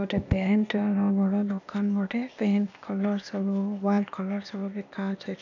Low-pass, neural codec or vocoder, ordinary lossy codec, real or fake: 7.2 kHz; codec, 16 kHz, 0.8 kbps, ZipCodec; none; fake